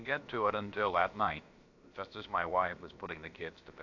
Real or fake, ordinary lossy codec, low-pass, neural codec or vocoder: fake; AAC, 48 kbps; 7.2 kHz; codec, 16 kHz, about 1 kbps, DyCAST, with the encoder's durations